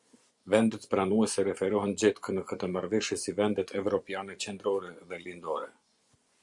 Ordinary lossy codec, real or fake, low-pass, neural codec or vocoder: Opus, 64 kbps; fake; 10.8 kHz; vocoder, 24 kHz, 100 mel bands, Vocos